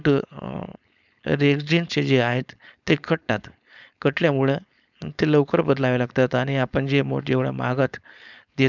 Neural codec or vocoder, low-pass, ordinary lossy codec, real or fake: codec, 16 kHz, 4.8 kbps, FACodec; 7.2 kHz; none; fake